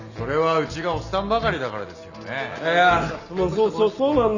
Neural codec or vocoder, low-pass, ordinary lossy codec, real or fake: none; 7.2 kHz; none; real